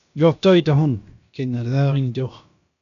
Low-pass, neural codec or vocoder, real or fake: 7.2 kHz; codec, 16 kHz, about 1 kbps, DyCAST, with the encoder's durations; fake